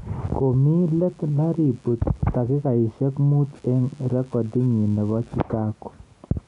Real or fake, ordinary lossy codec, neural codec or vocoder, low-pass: real; none; none; 10.8 kHz